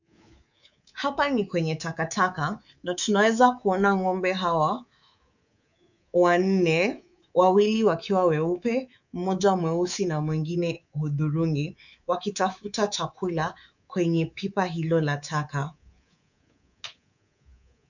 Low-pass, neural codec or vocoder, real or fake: 7.2 kHz; codec, 24 kHz, 3.1 kbps, DualCodec; fake